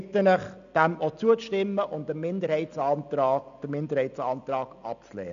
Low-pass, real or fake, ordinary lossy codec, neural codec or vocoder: 7.2 kHz; real; none; none